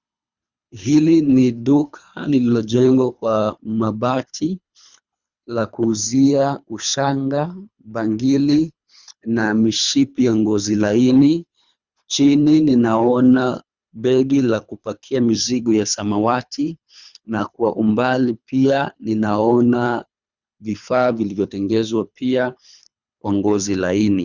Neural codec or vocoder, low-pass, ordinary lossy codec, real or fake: codec, 24 kHz, 3 kbps, HILCodec; 7.2 kHz; Opus, 64 kbps; fake